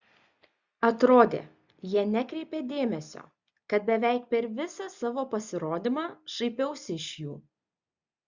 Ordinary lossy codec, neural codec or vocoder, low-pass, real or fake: Opus, 64 kbps; none; 7.2 kHz; real